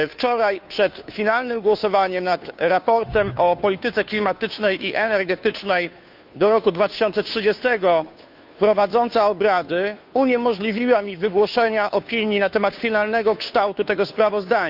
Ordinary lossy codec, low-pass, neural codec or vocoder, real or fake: none; 5.4 kHz; codec, 16 kHz, 2 kbps, FunCodec, trained on Chinese and English, 25 frames a second; fake